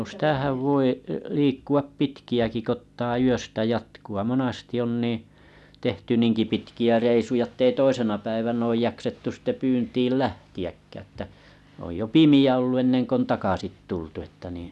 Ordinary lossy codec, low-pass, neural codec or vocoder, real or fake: none; none; none; real